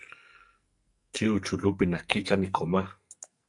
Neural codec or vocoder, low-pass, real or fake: codec, 44.1 kHz, 2.6 kbps, SNAC; 10.8 kHz; fake